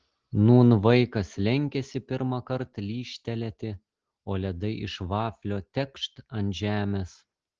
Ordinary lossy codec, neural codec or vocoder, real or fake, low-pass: Opus, 16 kbps; none; real; 7.2 kHz